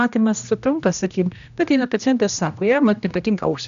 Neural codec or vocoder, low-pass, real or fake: codec, 16 kHz, 1 kbps, X-Codec, HuBERT features, trained on general audio; 7.2 kHz; fake